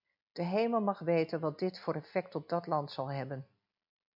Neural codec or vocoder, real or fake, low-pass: none; real; 5.4 kHz